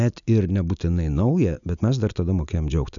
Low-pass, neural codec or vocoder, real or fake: 7.2 kHz; none; real